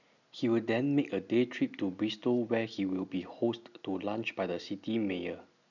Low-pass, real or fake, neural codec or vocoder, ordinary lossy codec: 7.2 kHz; real; none; none